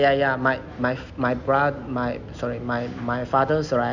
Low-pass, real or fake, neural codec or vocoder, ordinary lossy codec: 7.2 kHz; real; none; none